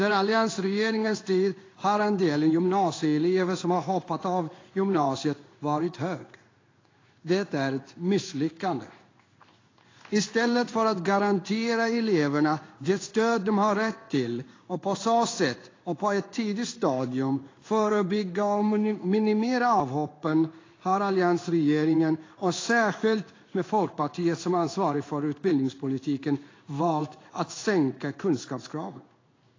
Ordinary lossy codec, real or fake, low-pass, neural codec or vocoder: AAC, 32 kbps; fake; 7.2 kHz; codec, 16 kHz in and 24 kHz out, 1 kbps, XY-Tokenizer